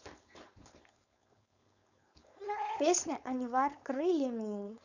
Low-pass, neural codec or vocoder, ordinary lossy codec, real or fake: 7.2 kHz; codec, 16 kHz, 4.8 kbps, FACodec; none; fake